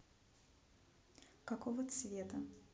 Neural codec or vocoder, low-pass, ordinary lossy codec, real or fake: none; none; none; real